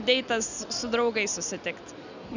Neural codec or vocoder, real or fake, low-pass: none; real; 7.2 kHz